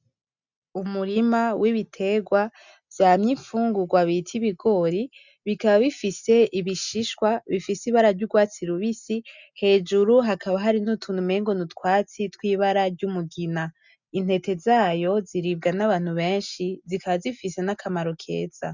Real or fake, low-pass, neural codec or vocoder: real; 7.2 kHz; none